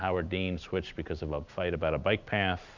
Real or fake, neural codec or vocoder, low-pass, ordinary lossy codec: real; none; 7.2 kHz; Opus, 64 kbps